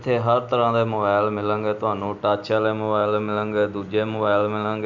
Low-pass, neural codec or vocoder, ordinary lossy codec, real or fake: 7.2 kHz; none; none; real